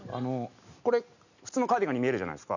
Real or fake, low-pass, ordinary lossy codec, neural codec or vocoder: real; 7.2 kHz; none; none